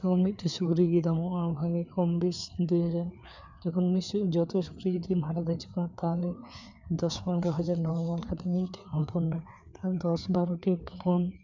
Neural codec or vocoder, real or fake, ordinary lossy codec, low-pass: codec, 16 kHz, 4 kbps, FreqCodec, larger model; fake; none; 7.2 kHz